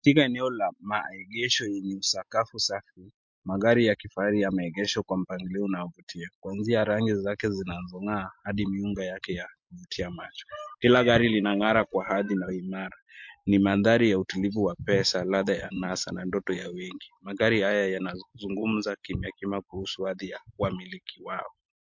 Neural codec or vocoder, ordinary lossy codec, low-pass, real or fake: none; MP3, 48 kbps; 7.2 kHz; real